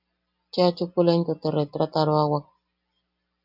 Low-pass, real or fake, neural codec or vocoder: 5.4 kHz; real; none